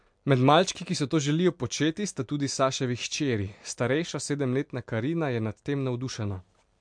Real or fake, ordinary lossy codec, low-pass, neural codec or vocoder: real; MP3, 64 kbps; 9.9 kHz; none